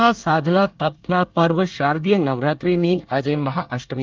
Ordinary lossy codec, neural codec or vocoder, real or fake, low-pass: Opus, 24 kbps; codec, 24 kHz, 1 kbps, SNAC; fake; 7.2 kHz